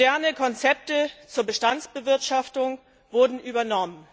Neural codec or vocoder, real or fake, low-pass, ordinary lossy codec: none; real; none; none